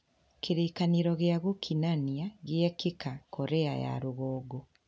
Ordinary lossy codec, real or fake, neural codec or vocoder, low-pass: none; real; none; none